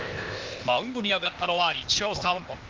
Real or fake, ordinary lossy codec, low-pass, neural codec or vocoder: fake; Opus, 32 kbps; 7.2 kHz; codec, 16 kHz, 0.8 kbps, ZipCodec